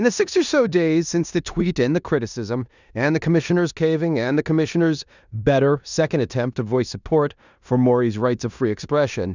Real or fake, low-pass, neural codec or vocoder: fake; 7.2 kHz; codec, 16 kHz in and 24 kHz out, 0.9 kbps, LongCat-Audio-Codec, four codebook decoder